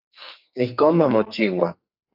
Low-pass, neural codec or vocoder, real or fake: 5.4 kHz; codec, 44.1 kHz, 2.6 kbps, SNAC; fake